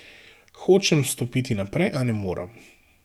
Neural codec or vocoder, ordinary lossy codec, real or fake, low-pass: codec, 44.1 kHz, 7.8 kbps, DAC; none; fake; 19.8 kHz